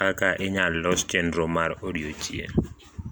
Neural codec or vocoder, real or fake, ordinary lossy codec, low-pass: none; real; none; none